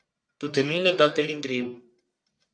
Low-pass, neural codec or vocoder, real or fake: 9.9 kHz; codec, 44.1 kHz, 1.7 kbps, Pupu-Codec; fake